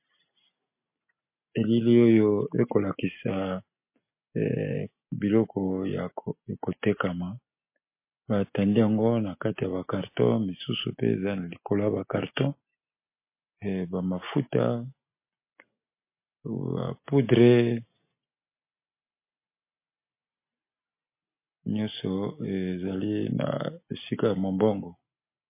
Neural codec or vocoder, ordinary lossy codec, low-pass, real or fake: none; MP3, 24 kbps; 3.6 kHz; real